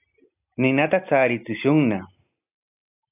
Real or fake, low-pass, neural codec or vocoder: real; 3.6 kHz; none